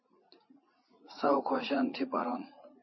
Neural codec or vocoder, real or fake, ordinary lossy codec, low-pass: vocoder, 44.1 kHz, 80 mel bands, Vocos; fake; MP3, 24 kbps; 7.2 kHz